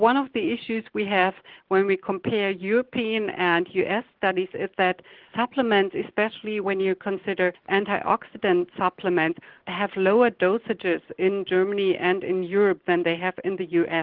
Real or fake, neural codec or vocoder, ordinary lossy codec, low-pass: real; none; Opus, 16 kbps; 5.4 kHz